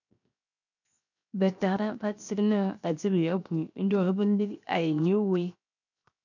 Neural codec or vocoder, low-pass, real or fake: codec, 16 kHz, 0.7 kbps, FocalCodec; 7.2 kHz; fake